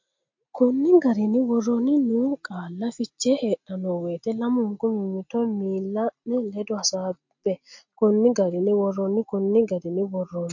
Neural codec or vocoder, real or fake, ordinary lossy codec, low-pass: none; real; MP3, 48 kbps; 7.2 kHz